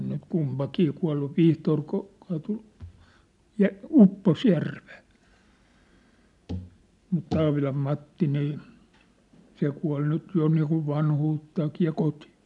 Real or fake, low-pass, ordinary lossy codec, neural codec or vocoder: real; 10.8 kHz; none; none